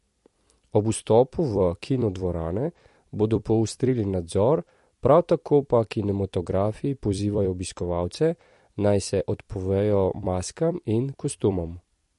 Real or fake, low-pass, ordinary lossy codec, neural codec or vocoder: fake; 14.4 kHz; MP3, 48 kbps; vocoder, 44.1 kHz, 128 mel bands every 256 samples, BigVGAN v2